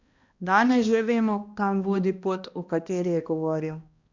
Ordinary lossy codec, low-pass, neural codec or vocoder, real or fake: Opus, 64 kbps; 7.2 kHz; codec, 16 kHz, 1 kbps, X-Codec, HuBERT features, trained on balanced general audio; fake